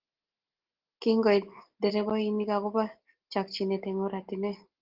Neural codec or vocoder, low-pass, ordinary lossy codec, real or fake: none; 5.4 kHz; Opus, 16 kbps; real